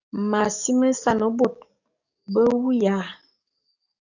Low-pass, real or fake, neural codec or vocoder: 7.2 kHz; fake; codec, 44.1 kHz, 7.8 kbps, DAC